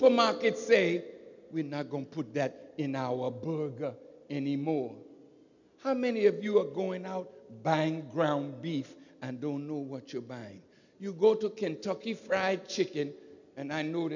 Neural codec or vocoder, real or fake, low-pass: none; real; 7.2 kHz